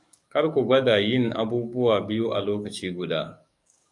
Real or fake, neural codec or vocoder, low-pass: fake; codec, 44.1 kHz, 7.8 kbps, DAC; 10.8 kHz